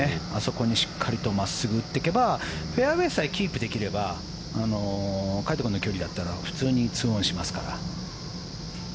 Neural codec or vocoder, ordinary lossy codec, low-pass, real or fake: none; none; none; real